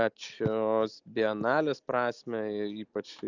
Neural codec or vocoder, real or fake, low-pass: none; real; 7.2 kHz